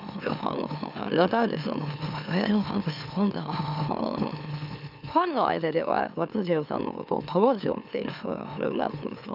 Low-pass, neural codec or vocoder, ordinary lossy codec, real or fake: 5.4 kHz; autoencoder, 44.1 kHz, a latent of 192 numbers a frame, MeloTTS; none; fake